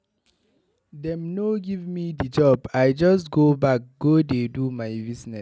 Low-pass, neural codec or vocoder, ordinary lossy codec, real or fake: none; none; none; real